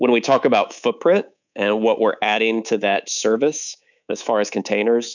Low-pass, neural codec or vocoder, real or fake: 7.2 kHz; codec, 24 kHz, 3.1 kbps, DualCodec; fake